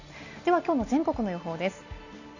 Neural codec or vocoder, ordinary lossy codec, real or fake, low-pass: none; none; real; 7.2 kHz